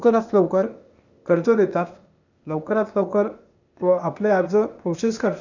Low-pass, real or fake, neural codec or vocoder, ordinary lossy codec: 7.2 kHz; fake; codec, 16 kHz, 0.8 kbps, ZipCodec; none